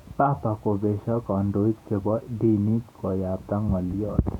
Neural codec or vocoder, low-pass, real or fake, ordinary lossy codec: vocoder, 48 kHz, 128 mel bands, Vocos; 19.8 kHz; fake; none